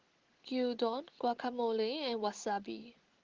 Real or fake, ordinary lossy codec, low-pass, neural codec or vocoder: real; Opus, 16 kbps; 7.2 kHz; none